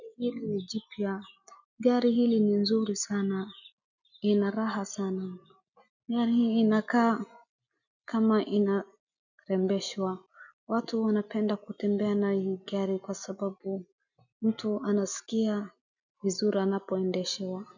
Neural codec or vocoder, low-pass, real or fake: none; 7.2 kHz; real